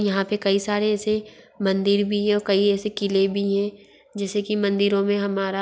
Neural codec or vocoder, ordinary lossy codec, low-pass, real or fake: none; none; none; real